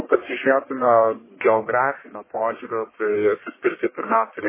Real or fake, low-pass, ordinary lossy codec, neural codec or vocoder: fake; 3.6 kHz; MP3, 16 kbps; codec, 44.1 kHz, 1.7 kbps, Pupu-Codec